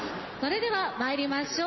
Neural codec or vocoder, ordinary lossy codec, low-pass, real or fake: none; MP3, 24 kbps; 7.2 kHz; real